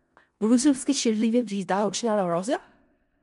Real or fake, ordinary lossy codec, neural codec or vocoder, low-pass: fake; MP3, 64 kbps; codec, 16 kHz in and 24 kHz out, 0.4 kbps, LongCat-Audio-Codec, four codebook decoder; 10.8 kHz